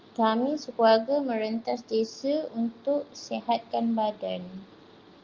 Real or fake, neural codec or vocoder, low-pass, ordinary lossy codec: real; none; 7.2 kHz; Opus, 24 kbps